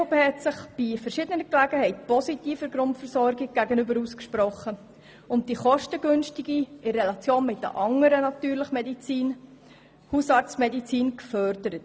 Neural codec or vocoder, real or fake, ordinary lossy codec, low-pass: none; real; none; none